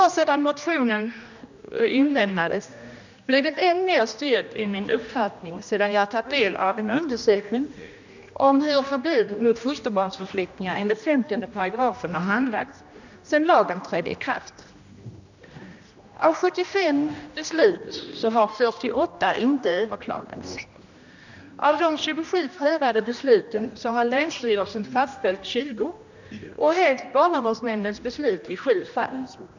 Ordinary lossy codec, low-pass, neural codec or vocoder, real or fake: none; 7.2 kHz; codec, 16 kHz, 1 kbps, X-Codec, HuBERT features, trained on general audio; fake